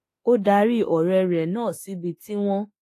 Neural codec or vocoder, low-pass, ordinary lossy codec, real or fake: autoencoder, 48 kHz, 32 numbers a frame, DAC-VAE, trained on Japanese speech; 14.4 kHz; AAC, 48 kbps; fake